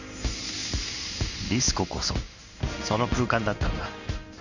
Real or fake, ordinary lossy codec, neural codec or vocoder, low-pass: fake; none; codec, 16 kHz in and 24 kHz out, 1 kbps, XY-Tokenizer; 7.2 kHz